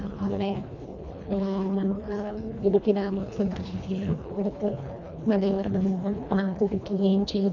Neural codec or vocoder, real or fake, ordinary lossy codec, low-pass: codec, 24 kHz, 1.5 kbps, HILCodec; fake; none; 7.2 kHz